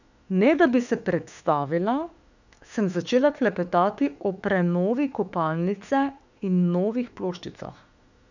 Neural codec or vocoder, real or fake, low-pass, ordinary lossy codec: autoencoder, 48 kHz, 32 numbers a frame, DAC-VAE, trained on Japanese speech; fake; 7.2 kHz; none